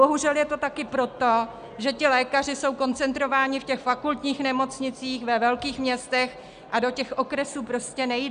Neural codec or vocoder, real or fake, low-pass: none; real; 9.9 kHz